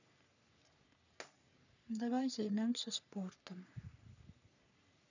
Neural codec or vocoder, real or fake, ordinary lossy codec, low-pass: codec, 44.1 kHz, 3.4 kbps, Pupu-Codec; fake; none; 7.2 kHz